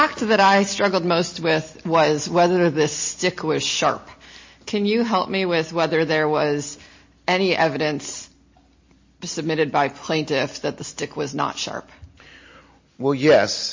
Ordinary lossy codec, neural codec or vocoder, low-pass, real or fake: MP3, 32 kbps; none; 7.2 kHz; real